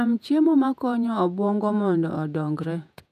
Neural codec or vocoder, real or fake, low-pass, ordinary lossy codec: vocoder, 48 kHz, 128 mel bands, Vocos; fake; 14.4 kHz; none